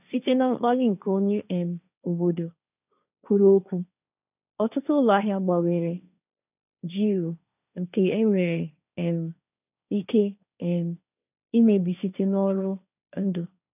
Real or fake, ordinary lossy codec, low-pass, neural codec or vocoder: fake; none; 3.6 kHz; codec, 16 kHz, 1.1 kbps, Voila-Tokenizer